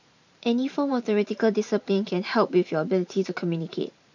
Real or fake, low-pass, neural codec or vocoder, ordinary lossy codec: fake; 7.2 kHz; vocoder, 44.1 kHz, 128 mel bands every 512 samples, BigVGAN v2; none